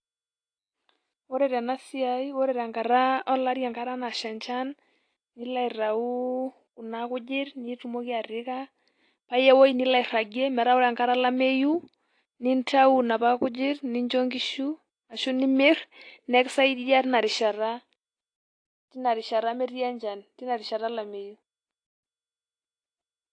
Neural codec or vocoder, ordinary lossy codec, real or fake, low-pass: none; AAC, 48 kbps; real; 9.9 kHz